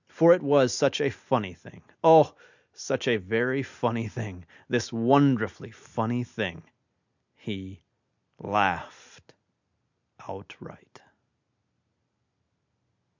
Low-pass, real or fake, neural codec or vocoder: 7.2 kHz; real; none